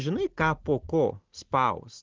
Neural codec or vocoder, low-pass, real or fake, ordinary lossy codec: none; 7.2 kHz; real; Opus, 16 kbps